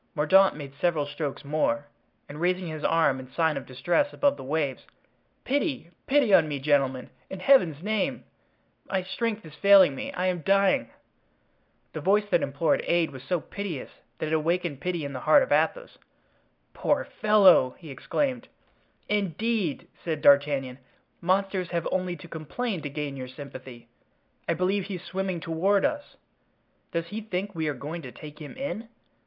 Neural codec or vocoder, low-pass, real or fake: none; 5.4 kHz; real